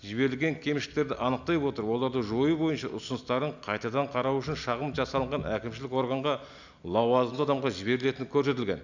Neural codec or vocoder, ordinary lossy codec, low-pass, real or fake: none; none; 7.2 kHz; real